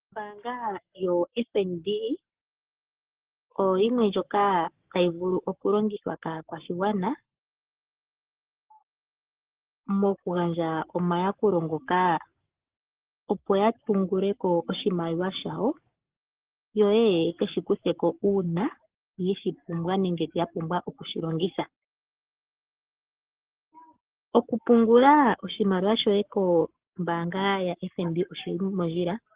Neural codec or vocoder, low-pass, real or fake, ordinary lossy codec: codec, 44.1 kHz, 7.8 kbps, Pupu-Codec; 3.6 kHz; fake; Opus, 16 kbps